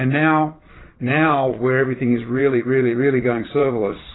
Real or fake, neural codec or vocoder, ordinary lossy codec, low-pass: fake; vocoder, 22.05 kHz, 80 mel bands, Vocos; AAC, 16 kbps; 7.2 kHz